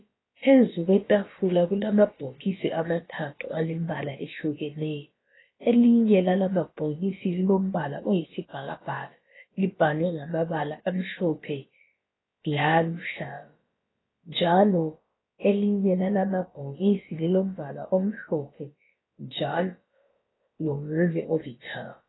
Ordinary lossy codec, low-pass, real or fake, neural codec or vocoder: AAC, 16 kbps; 7.2 kHz; fake; codec, 16 kHz, about 1 kbps, DyCAST, with the encoder's durations